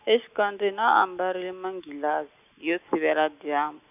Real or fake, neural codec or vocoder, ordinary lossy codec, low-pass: real; none; none; 3.6 kHz